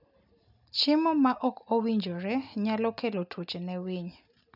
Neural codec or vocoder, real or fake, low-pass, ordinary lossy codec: none; real; 5.4 kHz; none